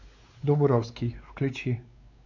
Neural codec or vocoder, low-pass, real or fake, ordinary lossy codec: codec, 16 kHz, 4 kbps, X-Codec, WavLM features, trained on Multilingual LibriSpeech; 7.2 kHz; fake; AAC, 48 kbps